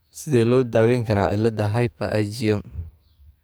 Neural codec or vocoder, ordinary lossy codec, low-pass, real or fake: codec, 44.1 kHz, 2.6 kbps, SNAC; none; none; fake